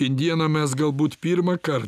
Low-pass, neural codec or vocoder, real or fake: 14.4 kHz; none; real